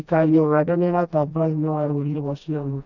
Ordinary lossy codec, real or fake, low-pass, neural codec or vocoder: none; fake; 7.2 kHz; codec, 16 kHz, 1 kbps, FreqCodec, smaller model